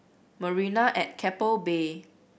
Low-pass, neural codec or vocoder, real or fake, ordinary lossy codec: none; none; real; none